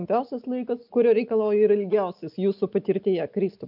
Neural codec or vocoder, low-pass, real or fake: none; 5.4 kHz; real